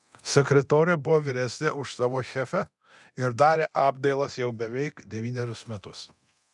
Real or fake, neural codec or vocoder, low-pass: fake; codec, 24 kHz, 0.9 kbps, DualCodec; 10.8 kHz